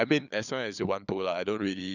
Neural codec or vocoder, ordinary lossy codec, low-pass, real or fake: codec, 16 kHz, 8 kbps, FunCodec, trained on LibriTTS, 25 frames a second; none; 7.2 kHz; fake